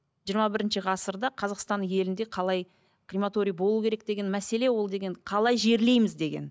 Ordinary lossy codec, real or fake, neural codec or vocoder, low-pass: none; real; none; none